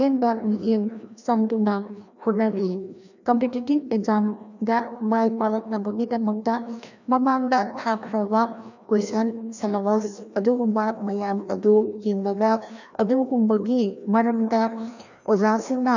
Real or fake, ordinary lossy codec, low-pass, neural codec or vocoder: fake; none; 7.2 kHz; codec, 16 kHz, 1 kbps, FreqCodec, larger model